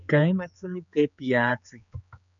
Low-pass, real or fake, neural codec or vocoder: 7.2 kHz; fake; codec, 16 kHz, 4 kbps, X-Codec, HuBERT features, trained on general audio